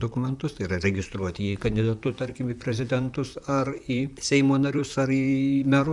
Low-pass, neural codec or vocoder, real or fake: 10.8 kHz; vocoder, 44.1 kHz, 128 mel bands, Pupu-Vocoder; fake